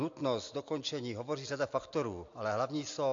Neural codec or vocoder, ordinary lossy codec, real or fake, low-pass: none; AAC, 48 kbps; real; 7.2 kHz